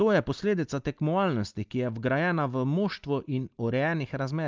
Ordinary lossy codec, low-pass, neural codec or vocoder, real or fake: Opus, 24 kbps; 7.2 kHz; none; real